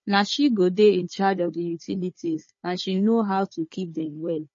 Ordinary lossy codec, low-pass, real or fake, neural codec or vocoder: MP3, 32 kbps; 7.2 kHz; fake; codec, 16 kHz, 4 kbps, FunCodec, trained on Chinese and English, 50 frames a second